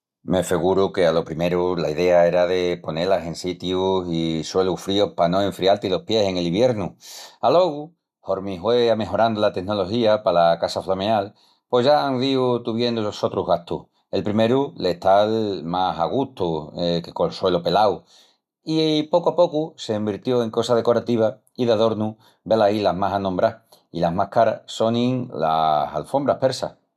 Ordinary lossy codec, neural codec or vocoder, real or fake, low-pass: none; none; real; 14.4 kHz